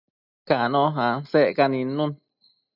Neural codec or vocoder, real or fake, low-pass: none; real; 5.4 kHz